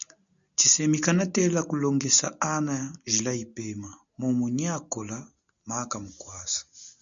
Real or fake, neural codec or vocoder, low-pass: real; none; 7.2 kHz